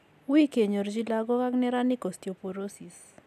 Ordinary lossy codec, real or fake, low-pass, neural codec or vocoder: none; real; 14.4 kHz; none